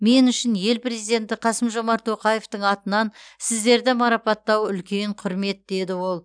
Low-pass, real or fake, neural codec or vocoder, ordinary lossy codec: 9.9 kHz; real; none; none